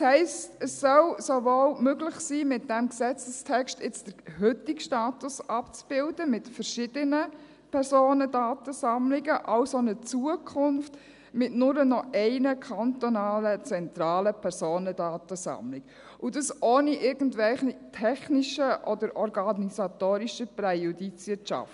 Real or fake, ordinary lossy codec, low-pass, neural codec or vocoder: real; none; 10.8 kHz; none